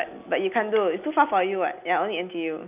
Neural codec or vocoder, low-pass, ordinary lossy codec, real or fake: none; 3.6 kHz; none; real